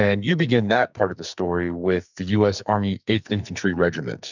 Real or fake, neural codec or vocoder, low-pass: fake; codec, 44.1 kHz, 2.6 kbps, SNAC; 7.2 kHz